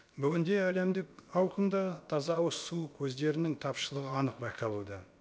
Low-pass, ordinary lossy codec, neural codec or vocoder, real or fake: none; none; codec, 16 kHz, about 1 kbps, DyCAST, with the encoder's durations; fake